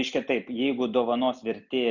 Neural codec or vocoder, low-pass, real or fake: none; 7.2 kHz; real